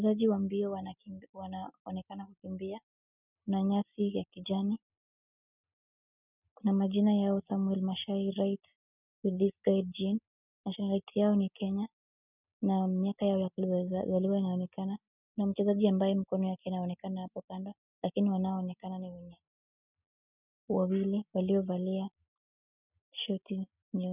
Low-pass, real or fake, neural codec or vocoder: 3.6 kHz; real; none